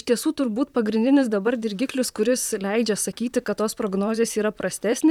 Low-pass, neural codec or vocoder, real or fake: 19.8 kHz; vocoder, 44.1 kHz, 128 mel bands, Pupu-Vocoder; fake